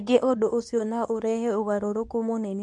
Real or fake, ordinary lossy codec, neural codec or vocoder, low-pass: fake; none; codec, 24 kHz, 0.9 kbps, WavTokenizer, medium speech release version 2; 10.8 kHz